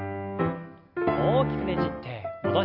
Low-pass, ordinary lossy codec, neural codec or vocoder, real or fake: 5.4 kHz; none; none; real